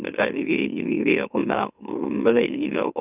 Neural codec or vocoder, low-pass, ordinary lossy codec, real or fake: autoencoder, 44.1 kHz, a latent of 192 numbers a frame, MeloTTS; 3.6 kHz; none; fake